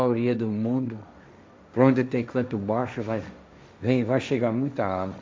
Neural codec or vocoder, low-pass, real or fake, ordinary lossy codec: codec, 16 kHz, 1.1 kbps, Voila-Tokenizer; none; fake; none